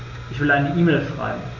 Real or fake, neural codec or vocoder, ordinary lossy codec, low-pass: real; none; none; 7.2 kHz